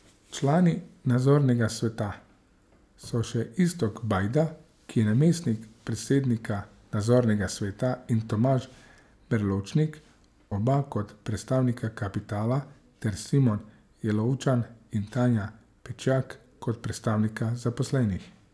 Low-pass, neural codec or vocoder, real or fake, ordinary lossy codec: none; none; real; none